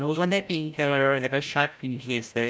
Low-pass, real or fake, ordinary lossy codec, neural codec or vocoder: none; fake; none; codec, 16 kHz, 0.5 kbps, FreqCodec, larger model